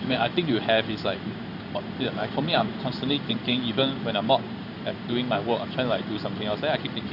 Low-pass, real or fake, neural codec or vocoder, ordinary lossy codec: 5.4 kHz; fake; codec, 16 kHz in and 24 kHz out, 1 kbps, XY-Tokenizer; none